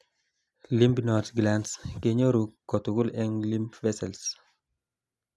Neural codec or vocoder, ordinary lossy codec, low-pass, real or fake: none; none; none; real